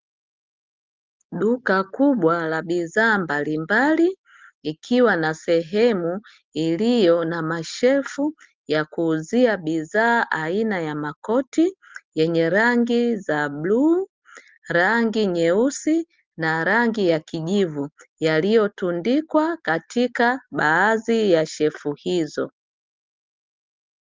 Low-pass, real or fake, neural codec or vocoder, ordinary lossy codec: 7.2 kHz; real; none; Opus, 32 kbps